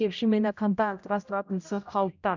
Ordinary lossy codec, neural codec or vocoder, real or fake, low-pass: none; codec, 16 kHz, 0.5 kbps, X-Codec, HuBERT features, trained on general audio; fake; 7.2 kHz